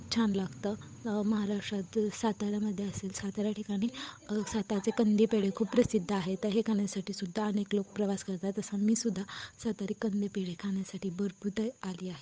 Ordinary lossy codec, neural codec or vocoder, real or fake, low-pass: none; codec, 16 kHz, 8 kbps, FunCodec, trained on Chinese and English, 25 frames a second; fake; none